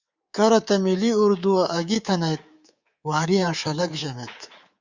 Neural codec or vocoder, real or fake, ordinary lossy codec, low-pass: codec, 24 kHz, 3.1 kbps, DualCodec; fake; Opus, 64 kbps; 7.2 kHz